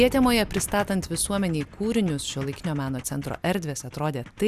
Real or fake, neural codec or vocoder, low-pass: real; none; 14.4 kHz